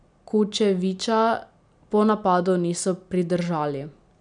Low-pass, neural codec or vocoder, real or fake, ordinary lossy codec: 9.9 kHz; none; real; none